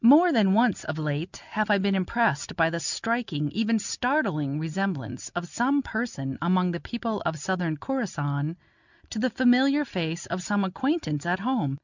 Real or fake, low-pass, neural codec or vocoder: real; 7.2 kHz; none